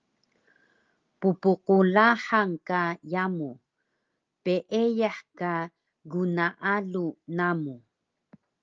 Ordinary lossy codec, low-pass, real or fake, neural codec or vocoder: Opus, 24 kbps; 7.2 kHz; real; none